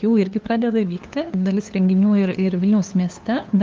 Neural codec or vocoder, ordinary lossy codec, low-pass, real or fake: codec, 16 kHz, 2 kbps, FunCodec, trained on LibriTTS, 25 frames a second; Opus, 24 kbps; 7.2 kHz; fake